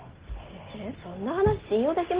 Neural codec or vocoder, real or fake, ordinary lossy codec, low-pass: none; real; Opus, 16 kbps; 3.6 kHz